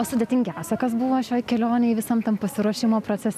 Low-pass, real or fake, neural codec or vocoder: 14.4 kHz; real; none